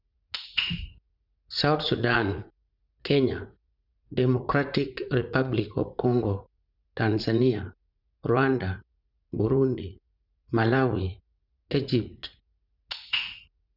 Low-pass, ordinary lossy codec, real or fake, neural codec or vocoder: 5.4 kHz; none; fake; vocoder, 44.1 kHz, 80 mel bands, Vocos